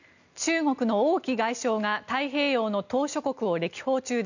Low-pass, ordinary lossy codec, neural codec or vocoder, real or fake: 7.2 kHz; none; none; real